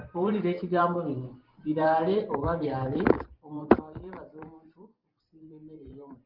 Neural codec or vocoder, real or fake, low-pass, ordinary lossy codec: vocoder, 44.1 kHz, 128 mel bands every 512 samples, BigVGAN v2; fake; 5.4 kHz; Opus, 24 kbps